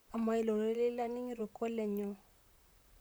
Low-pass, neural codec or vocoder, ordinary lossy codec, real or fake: none; vocoder, 44.1 kHz, 128 mel bands, Pupu-Vocoder; none; fake